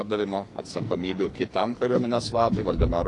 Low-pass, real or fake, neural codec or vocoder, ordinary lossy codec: 10.8 kHz; fake; codec, 44.1 kHz, 2.6 kbps, SNAC; AAC, 48 kbps